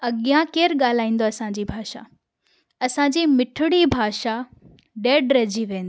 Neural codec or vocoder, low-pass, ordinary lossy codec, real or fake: none; none; none; real